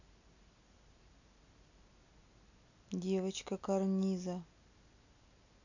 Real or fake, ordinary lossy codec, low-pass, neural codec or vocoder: real; none; 7.2 kHz; none